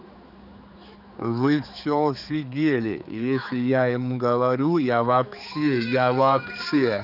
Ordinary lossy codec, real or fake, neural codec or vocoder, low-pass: none; fake; codec, 16 kHz, 4 kbps, X-Codec, HuBERT features, trained on general audio; 5.4 kHz